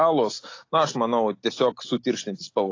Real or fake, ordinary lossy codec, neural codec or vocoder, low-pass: real; AAC, 32 kbps; none; 7.2 kHz